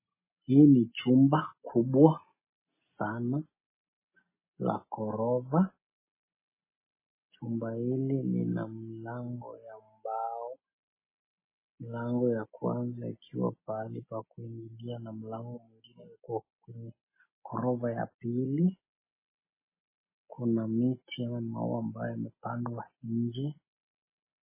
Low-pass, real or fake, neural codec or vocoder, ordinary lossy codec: 3.6 kHz; real; none; MP3, 16 kbps